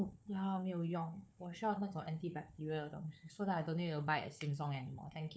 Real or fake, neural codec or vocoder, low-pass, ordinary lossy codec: fake; codec, 16 kHz, 4 kbps, FunCodec, trained on Chinese and English, 50 frames a second; none; none